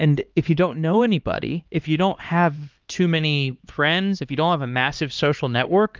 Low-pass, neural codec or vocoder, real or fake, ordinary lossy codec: 7.2 kHz; codec, 16 kHz, 2 kbps, X-Codec, HuBERT features, trained on LibriSpeech; fake; Opus, 32 kbps